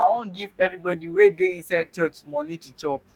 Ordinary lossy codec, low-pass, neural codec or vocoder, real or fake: none; 19.8 kHz; codec, 44.1 kHz, 2.6 kbps, DAC; fake